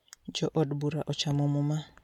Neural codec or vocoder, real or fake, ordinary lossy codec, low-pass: none; real; MP3, 96 kbps; 19.8 kHz